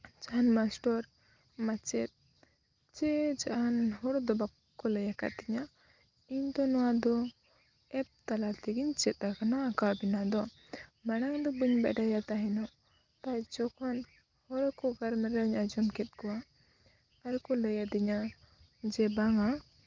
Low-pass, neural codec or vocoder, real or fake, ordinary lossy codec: 7.2 kHz; none; real; Opus, 32 kbps